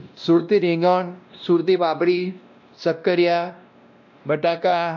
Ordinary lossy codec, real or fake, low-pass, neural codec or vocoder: none; fake; 7.2 kHz; codec, 16 kHz, 1 kbps, X-Codec, WavLM features, trained on Multilingual LibriSpeech